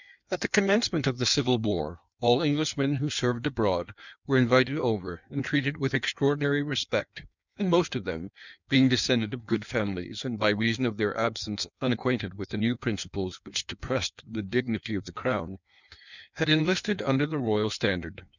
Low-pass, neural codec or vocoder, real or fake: 7.2 kHz; codec, 16 kHz in and 24 kHz out, 1.1 kbps, FireRedTTS-2 codec; fake